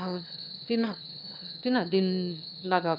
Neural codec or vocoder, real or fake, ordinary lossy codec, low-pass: autoencoder, 22.05 kHz, a latent of 192 numbers a frame, VITS, trained on one speaker; fake; none; 5.4 kHz